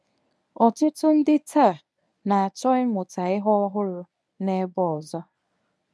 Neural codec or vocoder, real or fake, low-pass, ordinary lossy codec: codec, 24 kHz, 0.9 kbps, WavTokenizer, medium speech release version 1; fake; none; none